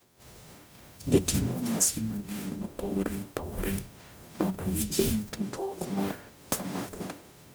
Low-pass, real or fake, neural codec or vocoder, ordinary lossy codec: none; fake; codec, 44.1 kHz, 0.9 kbps, DAC; none